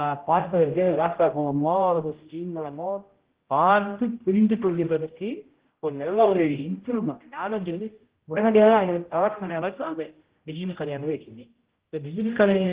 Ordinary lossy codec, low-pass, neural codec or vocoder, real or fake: Opus, 16 kbps; 3.6 kHz; codec, 16 kHz, 0.5 kbps, X-Codec, HuBERT features, trained on general audio; fake